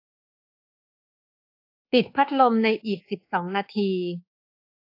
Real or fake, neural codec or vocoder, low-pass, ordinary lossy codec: fake; codec, 24 kHz, 1.2 kbps, DualCodec; 5.4 kHz; AAC, 32 kbps